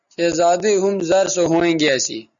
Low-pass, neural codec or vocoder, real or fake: 7.2 kHz; none; real